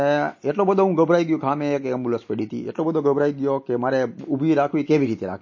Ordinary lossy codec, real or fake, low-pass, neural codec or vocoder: MP3, 32 kbps; real; 7.2 kHz; none